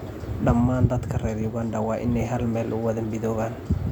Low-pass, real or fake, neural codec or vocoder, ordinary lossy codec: 19.8 kHz; real; none; none